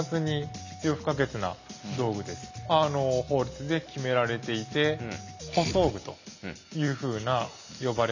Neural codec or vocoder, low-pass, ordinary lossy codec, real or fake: none; 7.2 kHz; none; real